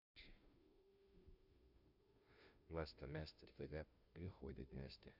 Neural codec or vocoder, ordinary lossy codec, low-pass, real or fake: codec, 16 kHz, 0.5 kbps, FunCodec, trained on Chinese and English, 25 frames a second; none; 5.4 kHz; fake